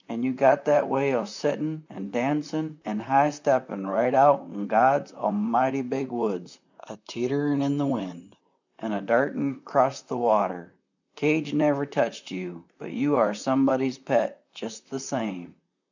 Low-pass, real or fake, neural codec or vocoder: 7.2 kHz; fake; vocoder, 44.1 kHz, 128 mel bands, Pupu-Vocoder